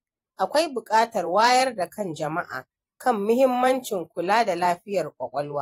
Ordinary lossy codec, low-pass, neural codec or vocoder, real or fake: AAC, 48 kbps; 19.8 kHz; vocoder, 44.1 kHz, 128 mel bands every 512 samples, BigVGAN v2; fake